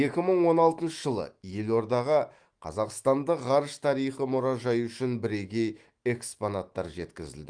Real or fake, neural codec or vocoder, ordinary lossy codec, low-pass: fake; autoencoder, 48 kHz, 128 numbers a frame, DAC-VAE, trained on Japanese speech; Opus, 24 kbps; 9.9 kHz